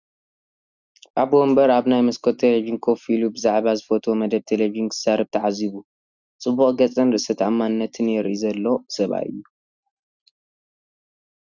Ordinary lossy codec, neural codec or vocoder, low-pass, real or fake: Opus, 64 kbps; none; 7.2 kHz; real